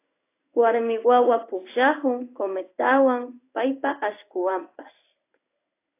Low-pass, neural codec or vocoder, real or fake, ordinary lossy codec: 3.6 kHz; codec, 16 kHz in and 24 kHz out, 1 kbps, XY-Tokenizer; fake; AAC, 24 kbps